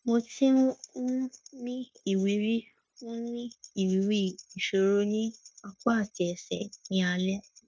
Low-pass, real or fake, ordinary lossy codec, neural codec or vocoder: none; fake; none; codec, 16 kHz, 0.9 kbps, LongCat-Audio-Codec